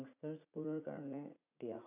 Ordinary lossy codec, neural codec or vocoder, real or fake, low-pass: none; vocoder, 44.1 kHz, 80 mel bands, Vocos; fake; 3.6 kHz